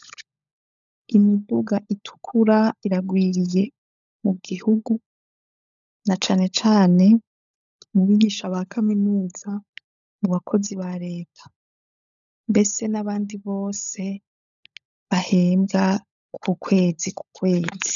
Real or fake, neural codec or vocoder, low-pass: fake; codec, 16 kHz, 16 kbps, FunCodec, trained on LibriTTS, 50 frames a second; 7.2 kHz